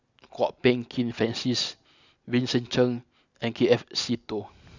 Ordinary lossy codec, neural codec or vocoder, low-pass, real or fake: none; none; 7.2 kHz; real